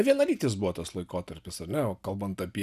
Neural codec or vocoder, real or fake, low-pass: none; real; 14.4 kHz